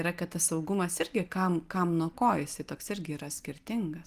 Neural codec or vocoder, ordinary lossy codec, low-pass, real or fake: none; Opus, 24 kbps; 14.4 kHz; real